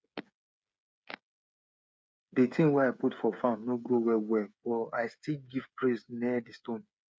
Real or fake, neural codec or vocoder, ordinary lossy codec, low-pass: fake; codec, 16 kHz, 8 kbps, FreqCodec, smaller model; none; none